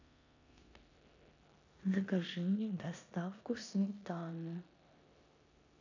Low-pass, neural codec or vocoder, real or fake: 7.2 kHz; codec, 16 kHz in and 24 kHz out, 0.9 kbps, LongCat-Audio-Codec, four codebook decoder; fake